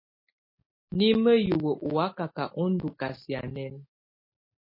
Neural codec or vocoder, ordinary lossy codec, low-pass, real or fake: none; MP3, 24 kbps; 5.4 kHz; real